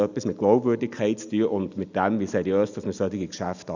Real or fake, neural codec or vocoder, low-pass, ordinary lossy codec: real; none; 7.2 kHz; none